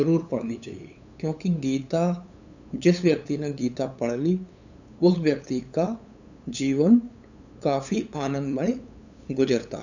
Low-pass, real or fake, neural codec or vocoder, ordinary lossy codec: 7.2 kHz; fake; codec, 16 kHz, 8 kbps, FunCodec, trained on LibriTTS, 25 frames a second; none